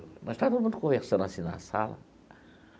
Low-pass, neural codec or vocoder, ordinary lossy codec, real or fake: none; none; none; real